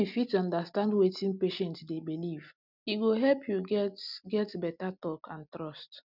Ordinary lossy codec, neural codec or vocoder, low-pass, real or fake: none; none; 5.4 kHz; real